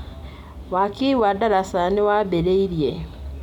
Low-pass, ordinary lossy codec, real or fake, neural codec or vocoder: 19.8 kHz; none; real; none